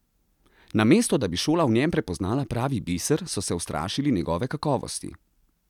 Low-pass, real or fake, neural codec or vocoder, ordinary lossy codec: 19.8 kHz; real; none; none